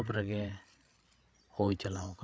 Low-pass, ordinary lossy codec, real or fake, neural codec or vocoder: none; none; fake; codec, 16 kHz, 8 kbps, FreqCodec, larger model